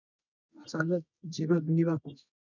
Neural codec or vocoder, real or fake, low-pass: codec, 24 kHz, 0.9 kbps, WavTokenizer, medium music audio release; fake; 7.2 kHz